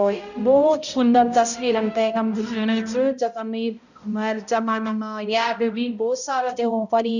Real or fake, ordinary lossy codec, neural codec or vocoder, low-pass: fake; none; codec, 16 kHz, 0.5 kbps, X-Codec, HuBERT features, trained on balanced general audio; 7.2 kHz